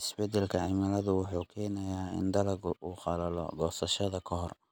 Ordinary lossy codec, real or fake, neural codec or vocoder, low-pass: none; real; none; none